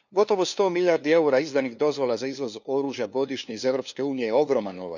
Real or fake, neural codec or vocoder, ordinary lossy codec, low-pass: fake; codec, 16 kHz, 2 kbps, FunCodec, trained on LibriTTS, 25 frames a second; none; 7.2 kHz